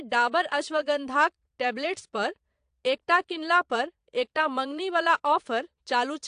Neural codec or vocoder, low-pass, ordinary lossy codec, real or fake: vocoder, 22.05 kHz, 80 mel bands, Vocos; 9.9 kHz; AAC, 64 kbps; fake